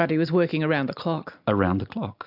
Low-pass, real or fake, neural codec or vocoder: 5.4 kHz; real; none